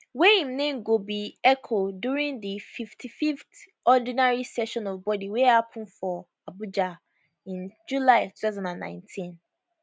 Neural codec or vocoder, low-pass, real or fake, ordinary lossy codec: none; none; real; none